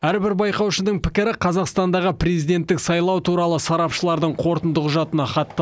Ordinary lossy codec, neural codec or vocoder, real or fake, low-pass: none; none; real; none